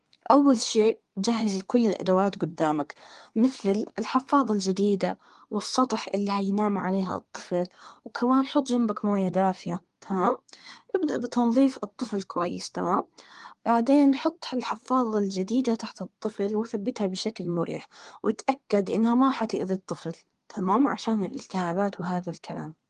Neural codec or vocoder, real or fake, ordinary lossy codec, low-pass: codec, 24 kHz, 1 kbps, SNAC; fake; Opus, 24 kbps; 10.8 kHz